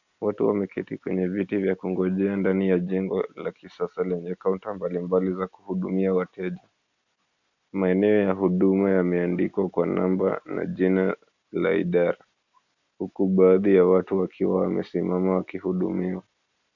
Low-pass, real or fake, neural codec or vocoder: 7.2 kHz; real; none